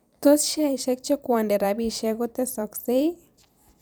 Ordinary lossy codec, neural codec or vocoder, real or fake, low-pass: none; vocoder, 44.1 kHz, 128 mel bands every 512 samples, BigVGAN v2; fake; none